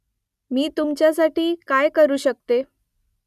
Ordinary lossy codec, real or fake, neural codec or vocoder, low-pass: none; real; none; 14.4 kHz